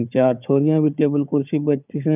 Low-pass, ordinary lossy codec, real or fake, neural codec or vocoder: 3.6 kHz; none; fake; codec, 16 kHz, 4 kbps, FunCodec, trained on Chinese and English, 50 frames a second